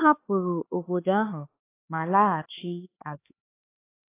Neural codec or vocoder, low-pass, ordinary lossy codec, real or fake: codec, 16 kHz, 4 kbps, X-Codec, HuBERT features, trained on LibriSpeech; 3.6 kHz; AAC, 24 kbps; fake